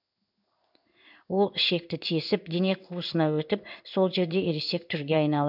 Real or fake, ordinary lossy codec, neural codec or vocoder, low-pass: fake; none; codec, 16 kHz in and 24 kHz out, 1 kbps, XY-Tokenizer; 5.4 kHz